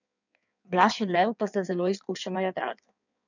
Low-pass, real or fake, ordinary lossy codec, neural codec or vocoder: 7.2 kHz; fake; none; codec, 16 kHz in and 24 kHz out, 1.1 kbps, FireRedTTS-2 codec